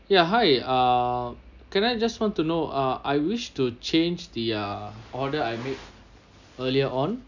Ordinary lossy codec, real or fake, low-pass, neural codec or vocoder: none; real; 7.2 kHz; none